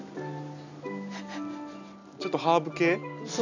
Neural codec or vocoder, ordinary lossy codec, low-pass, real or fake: none; none; 7.2 kHz; real